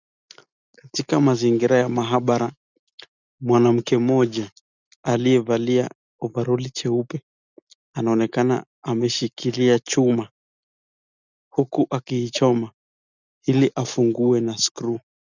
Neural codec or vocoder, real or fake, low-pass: none; real; 7.2 kHz